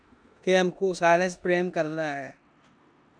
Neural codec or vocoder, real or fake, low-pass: codec, 16 kHz in and 24 kHz out, 0.9 kbps, LongCat-Audio-Codec, four codebook decoder; fake; 9.9 kHz